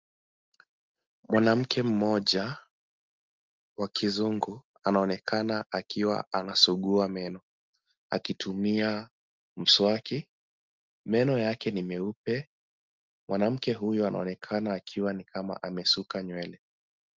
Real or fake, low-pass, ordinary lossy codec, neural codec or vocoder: real; 7.2 kHz; Opus, 32 kbps; none